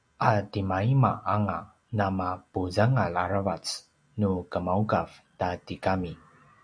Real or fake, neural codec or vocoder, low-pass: real; none; 9.9 kHz